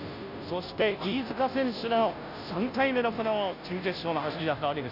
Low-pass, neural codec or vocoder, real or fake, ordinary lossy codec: 5.4 kHz; codec, 16 kHz, 0.5 kbps, FunCodec, trained on Chinese and English, 25 frames a second; fake; none